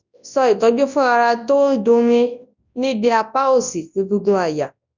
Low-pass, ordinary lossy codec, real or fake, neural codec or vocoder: 7.2 kHz; none; fake; codec, 24 kHz, 0.9 kbps, WavTokenizer, large speech release